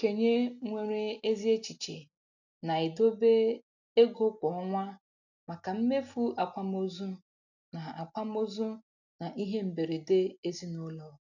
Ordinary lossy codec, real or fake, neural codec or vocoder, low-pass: none; real; none; 7.2 kHz